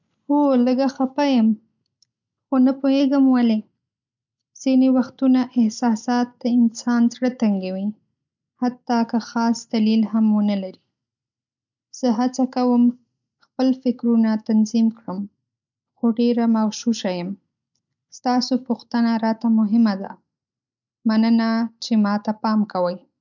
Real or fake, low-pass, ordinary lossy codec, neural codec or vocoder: real; 7.2 kHz; none; none